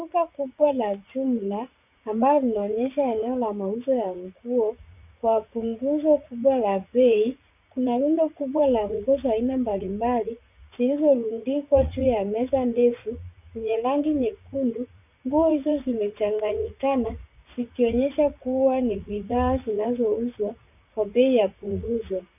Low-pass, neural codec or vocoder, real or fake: 3.6 kHz; vocoder, 44.1 kHz, 80 mel bands, Vocos; fake